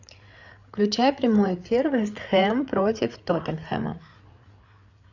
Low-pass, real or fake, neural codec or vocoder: 7.2 kHz; fake; codec, 16 kHz, 8 kbps, FreqCodec, larger model